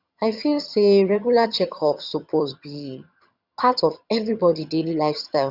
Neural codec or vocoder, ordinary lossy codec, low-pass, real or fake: vocoder, 22.05 kHz, 80 mel bands, HiFi-GAN; Opus, 64 kbps; 5.4 kHz; fake